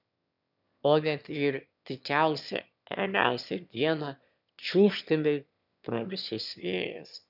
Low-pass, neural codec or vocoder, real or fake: 5.4 kHz; autoencoder, 22.05 kHz, a latent of 192 numbers a frame, VITS, trained on one speaker; fake